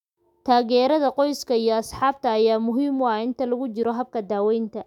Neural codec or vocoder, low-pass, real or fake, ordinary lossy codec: autoencoder, 48 kHz, 128 numbers a frame, DAC-VAE, trained on Japanese speech; 19.8 kHz; fake; none